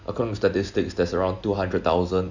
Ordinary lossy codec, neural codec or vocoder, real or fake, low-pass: none; none; real; 7.2 kHz